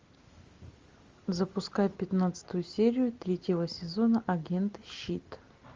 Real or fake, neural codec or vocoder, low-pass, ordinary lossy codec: real; none; 7.2 kHz; Opus, 32 kbps